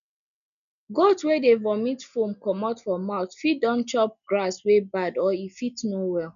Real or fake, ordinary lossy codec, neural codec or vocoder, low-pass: real; none; none; 7.2 kHz